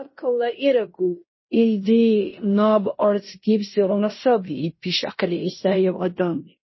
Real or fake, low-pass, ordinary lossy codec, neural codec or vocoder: fake; 7.2 kHz; MP3, 24 kbps; codec, 16 kHz in and 24 kHz out, 0.9 kbps, LongCat-Audio-Codec, fine tuned four codebook decoder